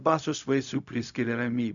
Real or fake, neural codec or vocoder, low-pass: fake; codec, 16 kHz, 0.4 kbps, LongCat-Audio-Codec; 7.2 kHz